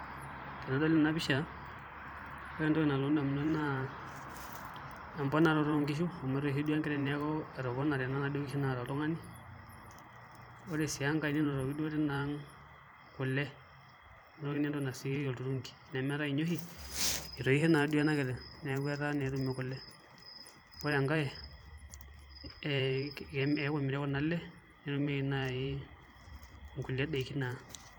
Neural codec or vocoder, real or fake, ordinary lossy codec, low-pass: vocoder, 44.1 kHz, 128 mel bands every 512 samples, BigVGAN v2; fake; none; none